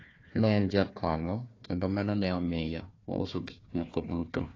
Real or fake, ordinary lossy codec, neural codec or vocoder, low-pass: fake; AAC, 32 kbps; codec, 16 kHz, 1 kbps, FunCodec, trained on Chinese and English, 50 frames a second; 7.2 kHz